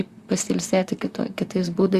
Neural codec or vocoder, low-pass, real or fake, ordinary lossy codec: vocoder, 44.1 kHz, 128 mel bands, Pupu-Vocoder; 14.4 kHz; fake; Opus, 64 kbps